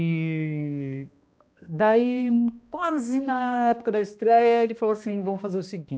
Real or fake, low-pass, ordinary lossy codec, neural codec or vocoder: fake; none; none; codec, 16 kHz, 1 kbps, X-Codec, HuBERT features, trained on balanced general audio